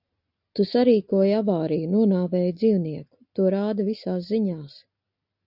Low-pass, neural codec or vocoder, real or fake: 5.4 kHz; none; real